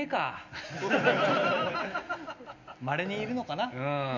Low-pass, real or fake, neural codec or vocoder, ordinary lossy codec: 7.2 kHz; real; none; none